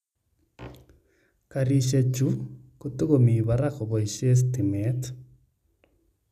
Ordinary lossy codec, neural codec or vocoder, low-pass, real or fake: none; none; 14.4 kHz; real